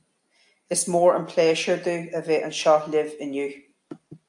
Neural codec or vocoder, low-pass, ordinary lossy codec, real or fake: none; 10.8 kHz; AAC, 64 kbps; real